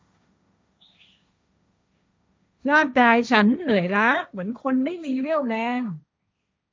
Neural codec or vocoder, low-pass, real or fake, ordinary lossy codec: codec, 16 kHz, 1.1 kbps, Voila-Tokenizer; none; fake; none